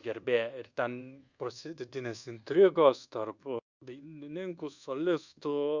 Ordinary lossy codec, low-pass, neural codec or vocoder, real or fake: Opus, 64 kbps; 7.2 kHz; codec, 24 kHz, 1.2 kbps, DualCodec; fake